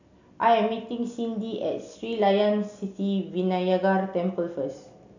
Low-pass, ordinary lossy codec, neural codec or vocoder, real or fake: 7.2 kHz; none; none; real